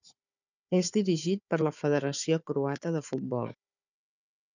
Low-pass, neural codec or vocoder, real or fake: 7.2 kHz; codec, 16 kHz, 4 kbps, FunCodec, trained on Chinese and English, 50 frames a second; fake